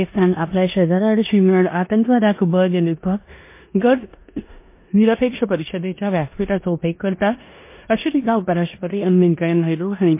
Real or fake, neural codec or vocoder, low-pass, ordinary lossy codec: fake; codec, 16 kHz in and 24 kHz out, 0.9 kbps, LongCat-Audio-Codec, four codebook decoder; 3.6 kHz; MP3, 24 kbps